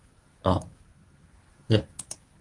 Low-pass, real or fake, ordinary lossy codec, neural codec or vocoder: 10.8 kHz; fake; Opus, 32 kbps; codec, 24 kHz, 0.9 kbps, WavTokenizer, medium speech release version 1